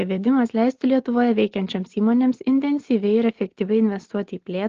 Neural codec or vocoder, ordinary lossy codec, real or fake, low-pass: none; Opus, 16 kbps; real; 7.2 kHz